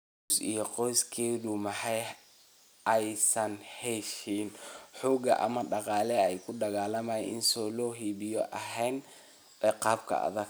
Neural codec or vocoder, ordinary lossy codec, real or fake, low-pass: none; none; real; none